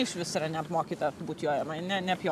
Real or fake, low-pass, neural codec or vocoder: real; 14.4 kHz; none